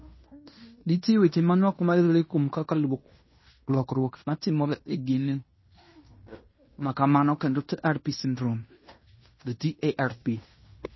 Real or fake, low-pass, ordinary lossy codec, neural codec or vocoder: fake; 7.2 kHz; MP3, 24 kbps; codec, 16 kHz in and 24 kHz out, 0.9 kbps, LongCat-Audio-Codec, fine tuned four codebook decoder